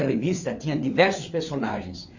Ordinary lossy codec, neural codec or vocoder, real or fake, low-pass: none; codec, 16 kHz, 4 kbps, FreqCodec, larger model; fake; 7.2 kHz